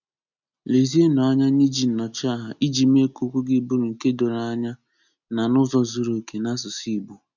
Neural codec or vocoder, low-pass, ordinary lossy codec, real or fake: none; 7.2 kHz; none; real